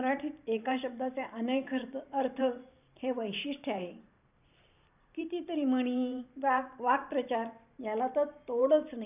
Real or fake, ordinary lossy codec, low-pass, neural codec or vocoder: fake; none; 3.6 kHz; vocoder, 44.1 kHz, 128 mel bands every 512 samples, BigVGAN v2